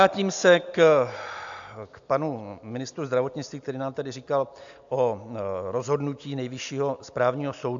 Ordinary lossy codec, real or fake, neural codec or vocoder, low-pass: AAC, 64 kbps; real; none; 7.2 kHz